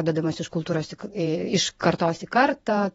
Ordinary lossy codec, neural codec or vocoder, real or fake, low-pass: AAC, 24 kbps; vocoder, 48 kHz, 128 mel bands, Vocos; fake; 19.8 kHz